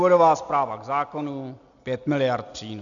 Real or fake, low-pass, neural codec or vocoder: real; 7.2 kHz; none